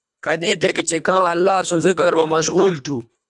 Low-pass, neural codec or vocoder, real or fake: 10.8 kHz; codec, 24 kHz, 1.5 kbps, HILCodec; fake